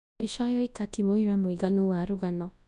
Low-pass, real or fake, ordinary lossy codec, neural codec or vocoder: 10.8 kHz; fake; none; codec, 24 kHz, 0.9 kbps, WavTokenizer, large speech release